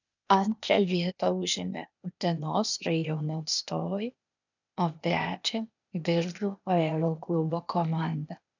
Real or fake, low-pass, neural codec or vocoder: fake; 7.2 kHz; codec, 16 kHz, 0.8 kbps, ZipCodec